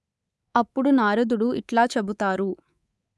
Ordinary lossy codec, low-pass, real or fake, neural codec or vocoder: none; none; fake; codec, 24 kHz, 3.1 kbps, DualCodec